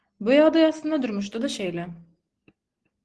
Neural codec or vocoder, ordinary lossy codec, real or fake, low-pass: none; Opus, 16 kbps; real; 10.8 kHz